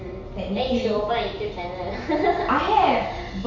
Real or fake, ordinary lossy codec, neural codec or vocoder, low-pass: real; none; none; 7.2 kHz